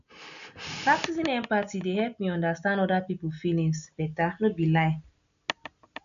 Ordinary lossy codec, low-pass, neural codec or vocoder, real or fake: none; 7.2 kHz; none; real